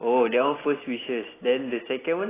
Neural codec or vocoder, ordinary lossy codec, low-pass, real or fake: none; AAC, 16 kbps; 3.6 kHz; real